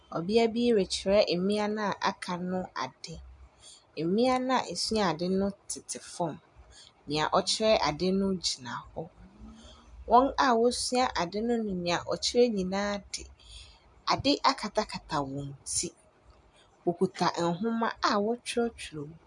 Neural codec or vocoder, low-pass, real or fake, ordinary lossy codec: none; 10.8 kHz; real; MP3, 96 kbps